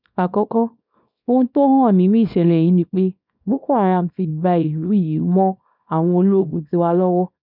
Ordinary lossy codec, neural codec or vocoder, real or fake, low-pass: none; codec, 24 kHz, 0.9 kbps, WavTokenizer, small release; fake; 5.4 kHz